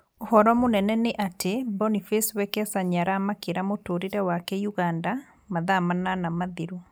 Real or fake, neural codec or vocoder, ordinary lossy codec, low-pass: real; none; none; none